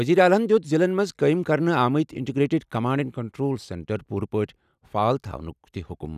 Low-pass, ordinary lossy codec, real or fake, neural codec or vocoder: 14.4 kHz; none; real; none